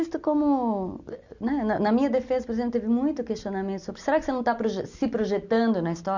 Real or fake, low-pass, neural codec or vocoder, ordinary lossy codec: real; 7.2 kHz; none; none